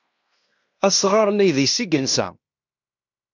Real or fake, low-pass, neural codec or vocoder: fake; 7.2 kHz; codec, 16 kHz in and 24 kHz out, 0.9 kbps, LongCat-Audio-Codec, fine tuned four codebook decoder